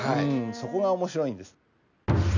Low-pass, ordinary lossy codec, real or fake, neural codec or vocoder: 7.2 kHz; none; real; none